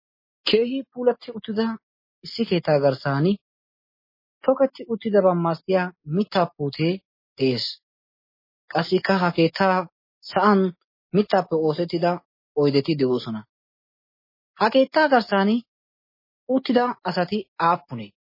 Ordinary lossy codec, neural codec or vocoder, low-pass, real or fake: MP3, 24 kbps; none; 5.4 kHz; real